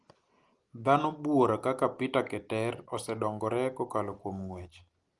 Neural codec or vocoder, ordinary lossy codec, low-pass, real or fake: none; Opus, 24 kbps; 10.8 kHz; real